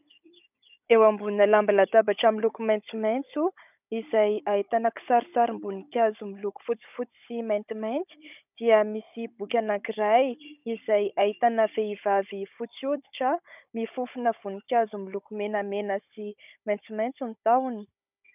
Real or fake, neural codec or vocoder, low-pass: fake; codec, 16 kHz, 16 kbps, FunCodec, trained on Chinese and English, 50 frames a second; 3.6 kHz